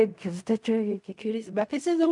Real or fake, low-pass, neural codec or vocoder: fake; 10.8 kHz; codec, 16 kHz in and 24 kHz out, 0.4 kbps, LongCat-Audio-Codec, fine tuned four codebook decoder